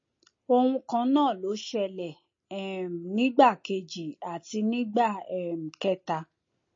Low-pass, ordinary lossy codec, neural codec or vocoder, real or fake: 7.2 kHz; MP3, 32 kbps; none; real